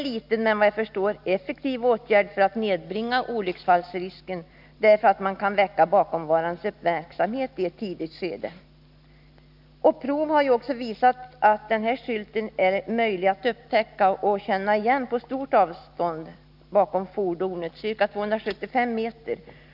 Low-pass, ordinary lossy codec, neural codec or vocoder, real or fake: 5.4 kHz; none; none; real